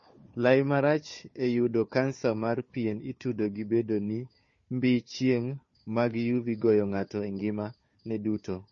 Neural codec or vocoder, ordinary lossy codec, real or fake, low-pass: codec, 16 kHz, 4 kbps, FunCodec, trained on Chinese and English, 50 frames a second; MP3, 32 kbps; fake; 7.2 kHz